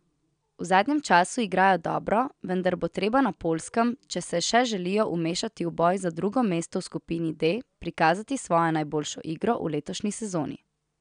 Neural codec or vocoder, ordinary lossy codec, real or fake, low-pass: none; none; real; 9.9 kHz